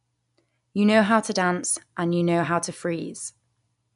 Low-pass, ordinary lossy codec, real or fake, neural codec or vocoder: 10.8 kHz; none; real; none